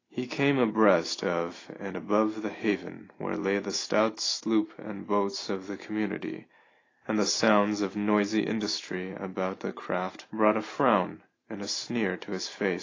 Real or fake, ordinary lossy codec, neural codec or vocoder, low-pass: real; AAC, 32 kbps; none; 7.2 kHz